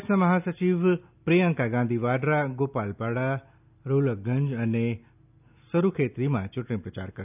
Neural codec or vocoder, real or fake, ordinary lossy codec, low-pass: none; real; none; 3.6 kHz